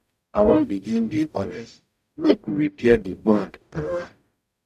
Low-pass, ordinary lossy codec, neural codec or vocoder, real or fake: 14.4 kHz; none; codec, 44.1 kHz, 0.9 kbps, DAC; fake